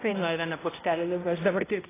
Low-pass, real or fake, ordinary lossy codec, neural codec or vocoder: 3.6 kHz; fake; AAC, 16 kbps; codec, 16 kHz, 0.5 kbps, X-Codec, HuBERT features, trained on balanced general audio